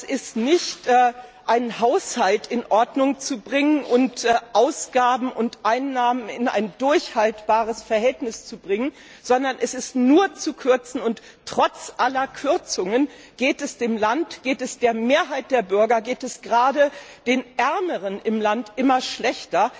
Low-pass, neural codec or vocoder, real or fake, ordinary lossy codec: none; none; real; none